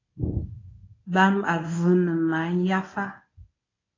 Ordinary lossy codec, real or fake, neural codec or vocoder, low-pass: AAC, 32 kbps; fake; codec, 24 kHz, 0.9 kbps, WavTokenizer, medium speech release version 1; 7.2 kHz